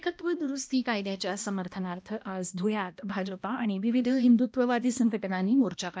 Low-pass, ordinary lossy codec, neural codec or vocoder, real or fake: none; none; codec, 16 kHz, 1 kbps, X-Codec, HuBERT features, trained on balanced general audio; fake